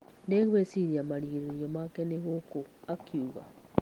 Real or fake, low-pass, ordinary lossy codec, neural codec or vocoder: real; 19.8 kHz; Opus, 24 kbps; none